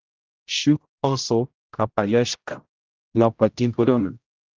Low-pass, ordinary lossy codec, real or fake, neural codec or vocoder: 7.2 kHz; Opus, 32 kbps; fake; codec, 16 kHz, 0.5 kbps, X-Codec, HuBERT features, trained on general audio